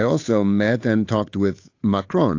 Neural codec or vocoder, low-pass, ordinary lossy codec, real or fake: codec, 16 kHz, 8 kbps, FunCodec, trained on Chinese and English, 25 frames a second; 7.2 kHz; AAC, 48 kbps; fake